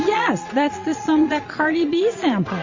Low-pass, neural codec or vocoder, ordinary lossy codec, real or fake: 7.2 kHz; vocoder, 22.05 kHz, 80 mel bands, Vocos; MP3, 32 kbps; fake